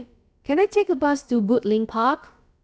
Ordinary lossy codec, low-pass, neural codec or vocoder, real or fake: none; none; codec, 16 kHz, about 1 kbps, DyCAST, with the encoder's durations; fake